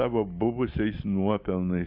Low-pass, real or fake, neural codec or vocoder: 5.4 kHz; real; none